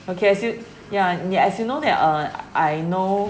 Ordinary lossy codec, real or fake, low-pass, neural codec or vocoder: none; real; none; none